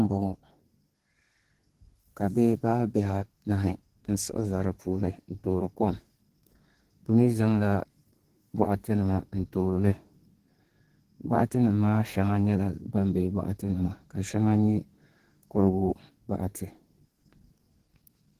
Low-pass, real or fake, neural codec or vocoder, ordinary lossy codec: 14.4 kHz; fake; codec, 32 kHz, 1.9 kbps, SNAC; Opus, 16 kbps